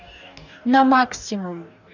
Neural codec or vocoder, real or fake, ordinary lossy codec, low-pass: codec, 44.1 kHz, 2.6 kbps, DAC; fake; none; 7.2 kHz